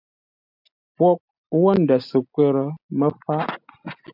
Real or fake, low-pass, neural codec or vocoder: real; 5.4 kHz; none